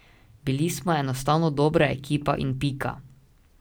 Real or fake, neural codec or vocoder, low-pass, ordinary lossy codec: real; none; none; none